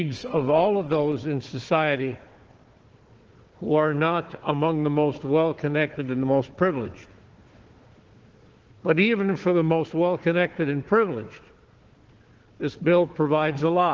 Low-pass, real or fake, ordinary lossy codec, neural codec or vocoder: 7.2 kHz; fake; Opus, 16 kbps; codec, 16 kHz, 4 kbps, FunCodec, trained on Chinese and English, 50 frames a second